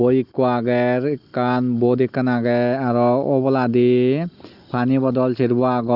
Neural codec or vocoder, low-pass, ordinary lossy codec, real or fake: none; 5.4 kHz; Opus, 32 kbps; real